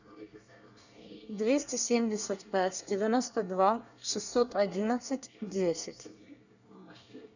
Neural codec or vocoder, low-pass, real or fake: codec, 24 kHz, 1 kbps, SNAC; 7.2 kHz; fake